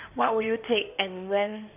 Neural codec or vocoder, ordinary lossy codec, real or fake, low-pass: codec, 16 kHz in and 24 kHz out, 2.2 kbps, FireRedTTS-2 codec; none; fake; 3.6 kHz